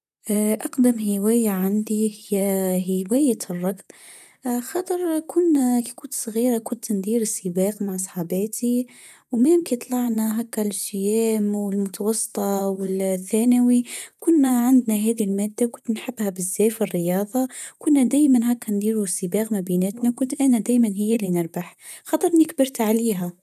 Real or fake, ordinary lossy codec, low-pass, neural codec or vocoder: fake; none; 14.4 kHz; vocoder, 44.1 kHz, 128 mel bands, Pupu-Vocoder